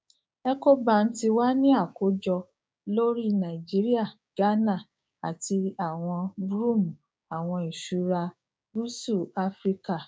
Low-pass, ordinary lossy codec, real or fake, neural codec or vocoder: none; none; fake; codec, 16 kHz, 6 kbps, DAC